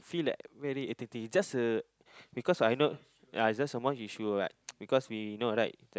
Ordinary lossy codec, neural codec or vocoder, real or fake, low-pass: none; none; real; none